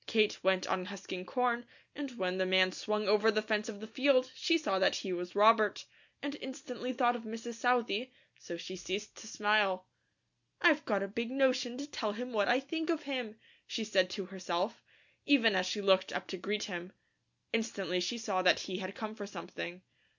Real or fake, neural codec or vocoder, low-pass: real; none; 7.2 kHz